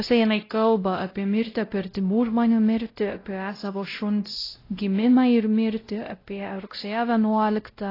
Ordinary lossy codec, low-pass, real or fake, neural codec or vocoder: AAC, 24 kbps; 5.4 kHz; fake; codec, 16 kHz, 0.5 kbps, X-Codec, WavLM features, trained on Multilingual LibriSpeech